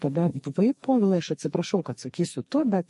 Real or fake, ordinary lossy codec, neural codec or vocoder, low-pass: fake; MP3, 48 kbps; codec, 44.1 kHz, 2.6 kbps, SNAC; 14.4 kHz